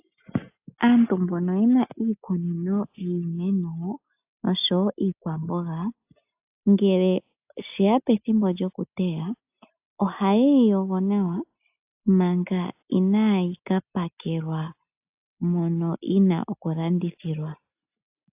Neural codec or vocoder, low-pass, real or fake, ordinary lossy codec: none; 3.6 kHz; real; AAC, 32 kbps